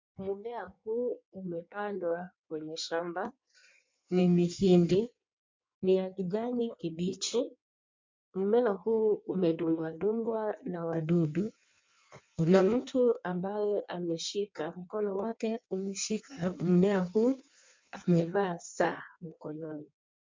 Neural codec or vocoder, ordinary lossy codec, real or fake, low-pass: codec, 16 kHz in and 24 kHz out, 1.1 kbps, FireRedTTS-2 codec; MP3, 64 kbps; fake; 7.2 kHz